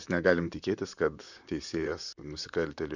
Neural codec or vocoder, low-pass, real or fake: vocoder, 44.1 kHz, 128 mel bands, Pupu-Vocoder; 7.2 kHz; fake